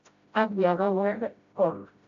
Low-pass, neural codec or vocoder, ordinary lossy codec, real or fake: 7.2 kHz; codec, 16 kHz, 0.5 kbps, FreqCodec, smaller model; AAC, 48 kbps; fake